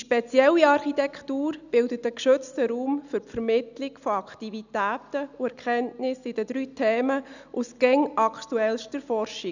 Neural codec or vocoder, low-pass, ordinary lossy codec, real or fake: none; 7.2 kHz; none; real